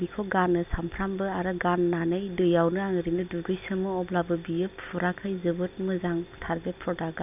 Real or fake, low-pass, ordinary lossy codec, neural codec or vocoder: real; 3.6 kHz; none; none